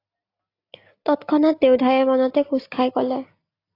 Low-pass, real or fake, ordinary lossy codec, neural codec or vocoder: 5.4 kHz; real; AAC, 32 kbps; none